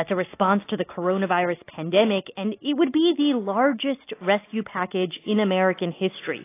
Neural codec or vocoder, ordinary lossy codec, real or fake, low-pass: none; AAC, 24 kbps; real; 3.6 kHz